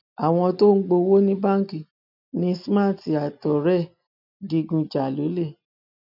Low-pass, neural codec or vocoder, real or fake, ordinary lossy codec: 5.4 kHz; none; real; none